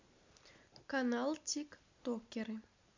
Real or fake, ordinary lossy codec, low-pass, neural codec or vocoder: real; MP3, 64 kbps; 7.2 kHz; none